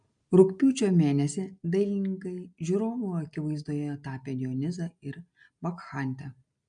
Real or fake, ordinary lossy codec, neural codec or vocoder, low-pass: real; MP3, 64 kbps; none; 9.9 kHz